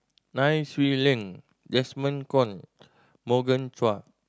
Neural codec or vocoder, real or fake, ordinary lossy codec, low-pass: none; real; none; none